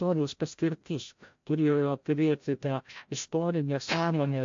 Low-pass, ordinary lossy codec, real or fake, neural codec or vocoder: 7.2 kHz; MP3, 48 kbps; fake; codec, 16 kHz, 0.5 kbps, FreqCodec, larger model